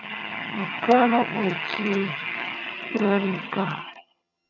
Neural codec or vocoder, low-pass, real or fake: vocoder, 22.05 kHz, 80 mel bands, HiFi-GAN; 7.2 kHz; fake